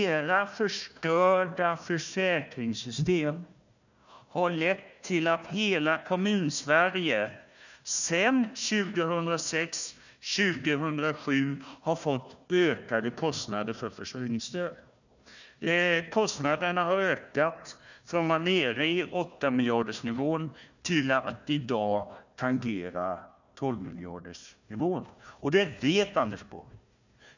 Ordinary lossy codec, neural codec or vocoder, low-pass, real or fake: none; codec, 16 kHz, 1 kbps, FunCodec, trained on Chinese and English, 50 frames a second; 7.2 kHz; fake